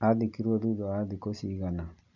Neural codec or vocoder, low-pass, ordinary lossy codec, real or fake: none; 7.2 kHz; none; real